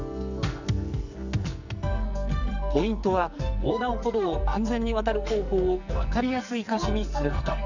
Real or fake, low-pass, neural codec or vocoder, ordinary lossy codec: fake; 7.2 kHz; codec, 44.1 kHz, 2.6 kbps, SNAC; none